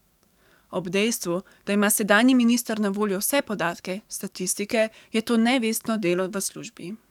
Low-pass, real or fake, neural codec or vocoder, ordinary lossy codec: 19.8 kHz; fake; codec, 44.1 kHz, 7.8 kbps, DAC; none